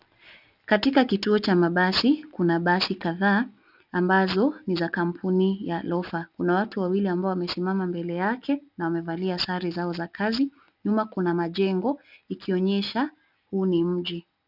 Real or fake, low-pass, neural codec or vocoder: real; 5.4 kHz; none